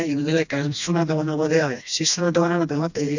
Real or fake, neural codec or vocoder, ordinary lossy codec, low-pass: fake; codec, 16 kHz, 1 kbps, FreqCodec, smaller model; none; 7.2 kHz